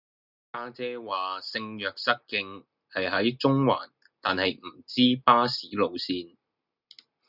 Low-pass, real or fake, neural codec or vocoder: 5.4 kHz; real; none